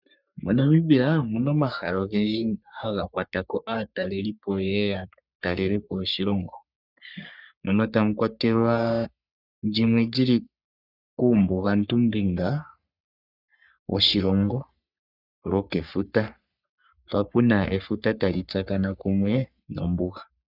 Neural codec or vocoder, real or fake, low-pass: codec, 44.1 kHz, 3.4 kbps, Pupu-Codec; fake; 5.4 kHz